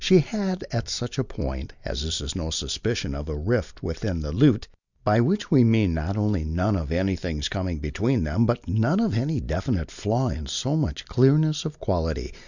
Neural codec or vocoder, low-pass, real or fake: none; 7.2 kHz; real